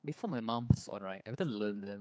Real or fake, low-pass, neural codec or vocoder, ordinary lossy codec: fake; none; codec, 16 kHz, 4 kbps, X-Codec, HuBERT features, trained on general audio; none